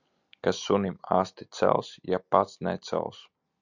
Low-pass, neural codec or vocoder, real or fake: 7.2 kHz; none; real